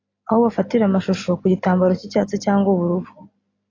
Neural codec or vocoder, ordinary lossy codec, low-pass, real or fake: none; AAC, 32 kbps; 7.2 kHz; real